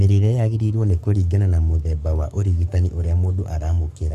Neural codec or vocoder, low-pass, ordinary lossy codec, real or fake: codec, 44.1 kHz, 7.8 kbps, Pupu-Codec; 14.4 kHz; none; fake